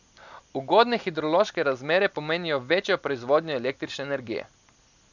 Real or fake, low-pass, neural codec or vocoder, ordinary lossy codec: real; 7.2 kHz; none; none